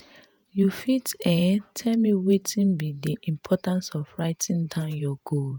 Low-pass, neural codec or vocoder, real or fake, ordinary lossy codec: none; vocoder, 48 kHz, 128 mel bands, Vocos; fake; none